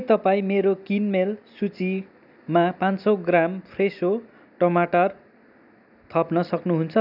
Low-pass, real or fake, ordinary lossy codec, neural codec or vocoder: 5.4 kHz; real; none; none